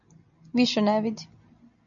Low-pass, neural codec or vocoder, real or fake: 7.2 kHz; none; real